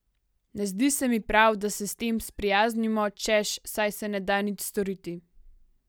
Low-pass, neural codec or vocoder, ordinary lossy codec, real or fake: none; none; none; real